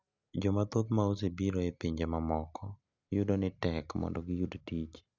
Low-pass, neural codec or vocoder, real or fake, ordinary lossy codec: 7.2 kHz; none; real; none